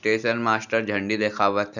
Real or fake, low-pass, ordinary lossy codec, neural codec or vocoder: real; 7.2 kHz; none; none